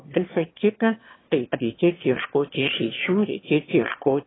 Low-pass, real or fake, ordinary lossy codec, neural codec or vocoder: 7.2 kHz; fake; AAC, 16 kbps; autoencoder, 22.05 kHz, a latent of 192 numbers a frame, VITS, trained on one speaker